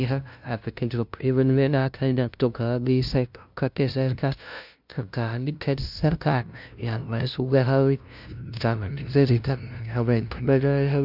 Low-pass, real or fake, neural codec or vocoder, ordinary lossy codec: 5.4 kHz; fake; codec, 16 kHz, 0.5 kbps, FunCodec, trained on LibriTTS, 25 frames a second; none